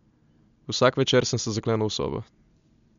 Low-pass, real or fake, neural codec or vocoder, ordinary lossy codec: 7.2 kHz; real; none; MP3, 64 kbps